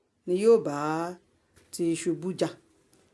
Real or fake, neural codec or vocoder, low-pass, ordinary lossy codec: real; none; none; none